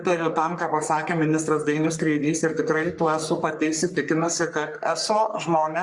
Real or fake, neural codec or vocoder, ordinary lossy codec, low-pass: fake; codec, 44.1 kHz, 3.4 kbps, Pupu-Codec; Opus, 64 kbps; 10.8 kHz